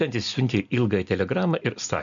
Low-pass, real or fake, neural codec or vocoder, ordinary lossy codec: 7.2 kHz; real; none; MP3, 96 kbps